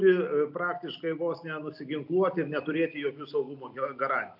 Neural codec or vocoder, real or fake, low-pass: none; real; 5.4 kHz